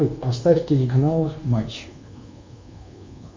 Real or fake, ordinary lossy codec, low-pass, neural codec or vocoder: fake; MP3, 64 kbps; 7.2 kHz; codec, 24 kHz, 1.2 kbps, DualCodec